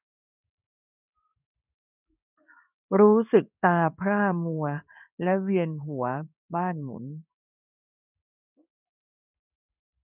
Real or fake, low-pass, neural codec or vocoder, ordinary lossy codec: fake; 3.6 kHz; codec, 16 kHz in and 24 kHz out, 1 kbps, XY-Tokenizer; none